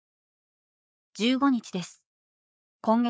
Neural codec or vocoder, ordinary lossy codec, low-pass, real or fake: codec, 16 kHz, 4 kbps, FreqCodec, larger model; none; none; fake